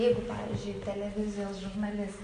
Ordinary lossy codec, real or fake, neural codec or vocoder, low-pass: AAC, 48 kbps; real; none; 9.9 kHz